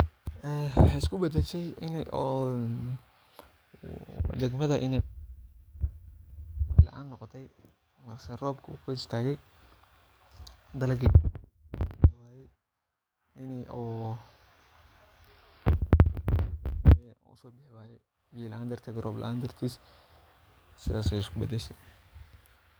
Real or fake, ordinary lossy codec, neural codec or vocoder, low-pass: fake; none; codec, 44.1 kHz, 7.8 kbps, DAC; none